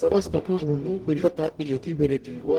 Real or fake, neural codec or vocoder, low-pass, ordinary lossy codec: fake; codec, 44.1 kHz, 0.9 kbps, DAC; 19.8 kHz; Opus, 24 kbps